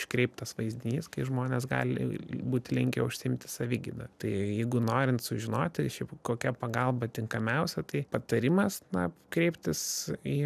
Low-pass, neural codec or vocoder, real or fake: 14.4 kHz; none; real